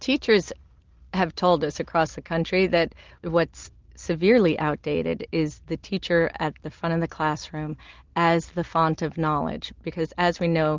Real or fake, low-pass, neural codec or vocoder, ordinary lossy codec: real; 7.2 kHz; none; Opus, 24 kbps